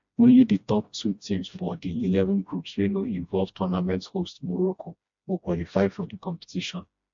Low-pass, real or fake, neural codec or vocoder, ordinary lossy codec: 7.2 kHz; fake; codec, 16 kHz, 1 kbps, FreqCodec, smaller model; MP3, 48 kbps